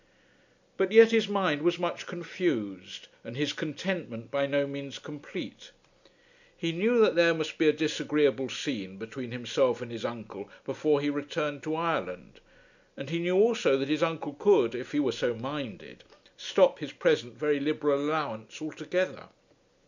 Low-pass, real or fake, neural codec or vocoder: 7.2 kHz; real; none